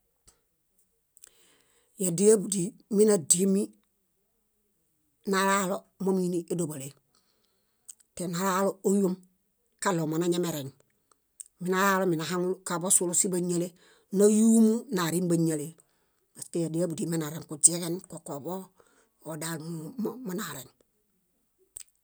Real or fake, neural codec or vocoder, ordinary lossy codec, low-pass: real; none; none; none